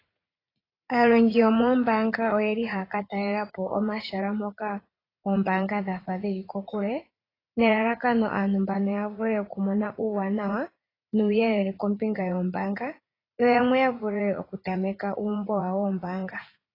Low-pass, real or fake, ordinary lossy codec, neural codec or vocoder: 5.4 kHz; fake; AAC, 24 kbps; vocoder, 44.1 kHz, 80 mel bands, Vocos